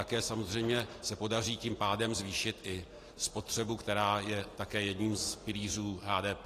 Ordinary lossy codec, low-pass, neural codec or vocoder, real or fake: AAC, 48 kbps; 14.4 kHz; none; real